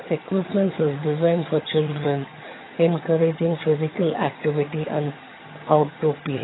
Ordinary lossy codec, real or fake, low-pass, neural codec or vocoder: AAC, 16 kbps; fake; 7.2 kHz; vocoder, 22.05 kHz, 80 mel bands, HiFi-GAN